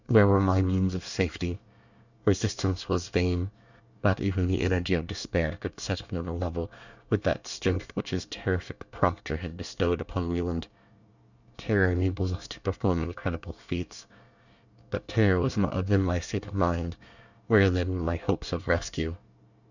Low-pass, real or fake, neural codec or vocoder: 7.2 kHz; fake; codec, 24 kHz, 1 kbps, SNAC